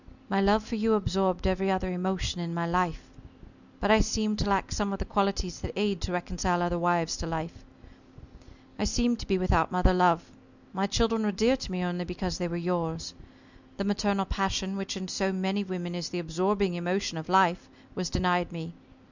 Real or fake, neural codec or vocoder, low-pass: real; none; 7.2 kHz